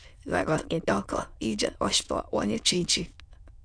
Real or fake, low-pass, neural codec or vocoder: fake; 9.9 kHz; autoencoder, 22.05 kHz, a latent of 192 numbers a frame, VITS, trained on many speakers